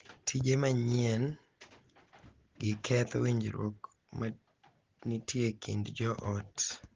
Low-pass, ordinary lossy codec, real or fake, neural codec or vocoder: 7.2 kHz; Opus, 16 kbps; real; none